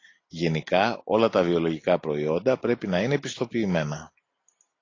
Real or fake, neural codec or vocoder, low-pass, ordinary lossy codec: real; none; 7.2 kHz; AAC, 32 kbps